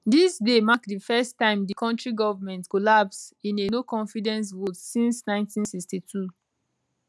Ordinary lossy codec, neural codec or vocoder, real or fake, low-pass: none; none; real; none